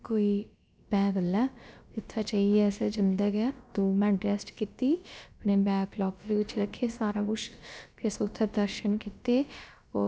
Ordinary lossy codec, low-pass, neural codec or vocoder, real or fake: none; none; codec, 16 kHz, about 1 kbps, DyCAST, with the encoder's durations; fake